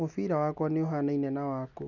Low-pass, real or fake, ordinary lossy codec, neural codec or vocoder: 7.2 kHz; real; none; none